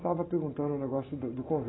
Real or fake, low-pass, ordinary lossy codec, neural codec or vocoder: real; 7.2 kHz; AAC, 16 kbps; none